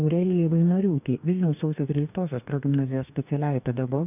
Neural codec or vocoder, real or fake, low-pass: codec, 44.1 kHz, 2.6 kbps, DAC; fake; 3.6 kHz